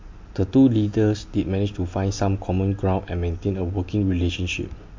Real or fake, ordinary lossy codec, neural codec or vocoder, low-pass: real; MP3, 48 kbps; none; 7.2 kHz